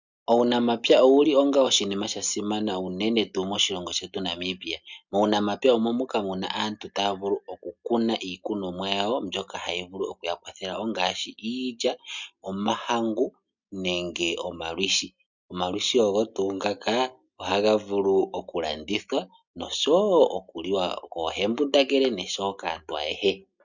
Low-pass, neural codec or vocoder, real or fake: 7.2 kHz; none; real